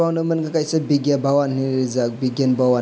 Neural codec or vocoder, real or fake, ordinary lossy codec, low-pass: none; real; none; none